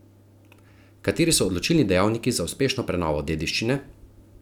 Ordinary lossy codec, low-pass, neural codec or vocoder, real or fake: none; 19.8 kHz; none; real